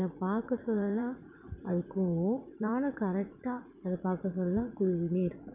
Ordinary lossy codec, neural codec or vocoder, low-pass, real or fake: none; vocoder, 44.1 kHz, 80 mel bands, Vocos; 3.6 kHz; fake